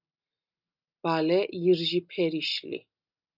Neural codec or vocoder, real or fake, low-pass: none; real; 5.4 kHz